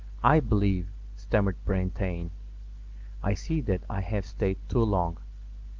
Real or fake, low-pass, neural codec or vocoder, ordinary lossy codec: real; 7.2 kHz; none; Opus, 32 kbps